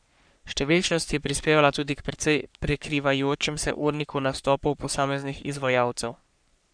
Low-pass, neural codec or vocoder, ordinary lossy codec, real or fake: 9.9 kHz; codec, 44.1 kHz, 3.4 kbps, Pupu-Codec; none; fake